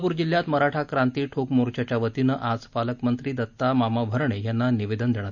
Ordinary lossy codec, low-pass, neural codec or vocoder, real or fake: none; 7.2 kHz; none; real